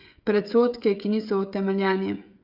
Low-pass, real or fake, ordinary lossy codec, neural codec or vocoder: 5.4 kHz; fake; Opus, 64 kbps; codec, 16 kHz, 16 kbps, FreqCodec, smaller model